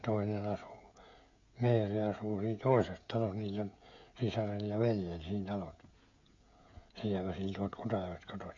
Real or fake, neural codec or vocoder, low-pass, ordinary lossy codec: fake; codec, 16 kHz, 16 kbps, FreqCodec, smaller model; 7.2 kHz; MP3, 48 kbps